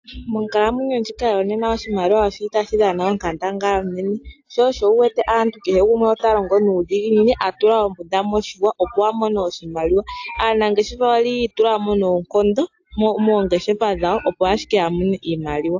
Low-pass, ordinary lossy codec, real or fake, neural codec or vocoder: 7.2 kHz; AAC, 48 kbps; real; none